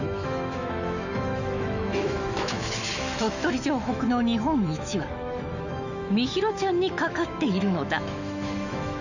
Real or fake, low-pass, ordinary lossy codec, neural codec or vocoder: fake; 7.2 kHz; none; autoencoder, 48 kHz, 128 numbers a frame, DAC-VAE, trained on Japanese speech